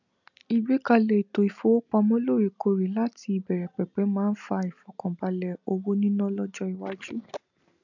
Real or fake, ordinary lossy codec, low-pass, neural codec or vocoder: real; none; 7.2 kHz; none